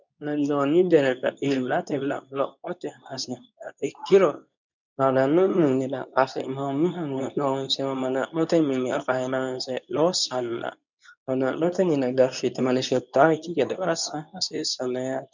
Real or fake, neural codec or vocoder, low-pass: fake; codec, 24 kHz, 0.9 kbps, WavTokenizer, medium speech release version 2; 7.2 kHz